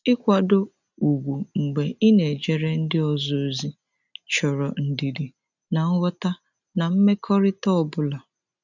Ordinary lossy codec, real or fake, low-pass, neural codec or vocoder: none; real; 7.2 kHz; none